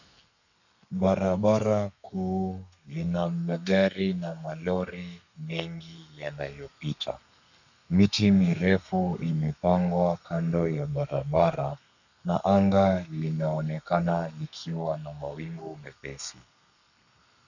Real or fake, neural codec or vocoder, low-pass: fake; codec, 32 kHz, 1.9 kbps, SNAC; 7.2 kHz